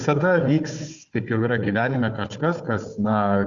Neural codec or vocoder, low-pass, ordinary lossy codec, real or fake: codec, 16 kHz, 4 kbps, FunCodec, trained on Chinese and English, 50 frames a second; 7.2 kHz; Opus, 64 kbps; fake